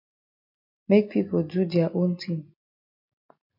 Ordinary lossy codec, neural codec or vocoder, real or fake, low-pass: MP3, 32 kbps; none; real; 5.4 kHz